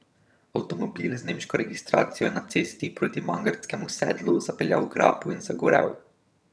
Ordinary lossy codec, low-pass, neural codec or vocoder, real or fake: none; none; vocoder, 22.05 kHz, 80 mel bands, HiFi-GAN; fake